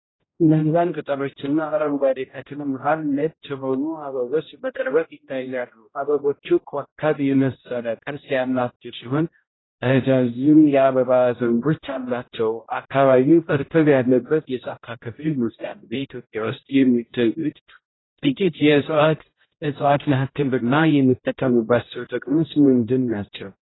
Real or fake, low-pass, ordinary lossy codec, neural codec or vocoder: fake; 7.2 kHz; AAC, 16 kbps; codec, 16 kHz, 0.5 kbps, X-Codec, HuBERT features, trained on general audio